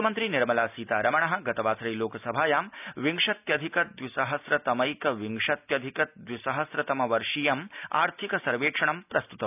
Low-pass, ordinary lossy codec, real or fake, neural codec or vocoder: 3.6 kHz; none; real; none